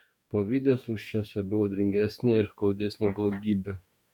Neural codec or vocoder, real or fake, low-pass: codec, 44.1 kHz, 2.6 kbps, DAC; fake; 19.8 kHz